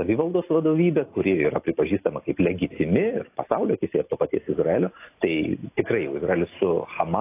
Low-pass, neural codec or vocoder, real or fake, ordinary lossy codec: 3.6 kHz; none; real; AAC, 24 kbps